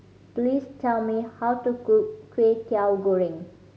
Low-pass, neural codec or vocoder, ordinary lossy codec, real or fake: none; none; none; real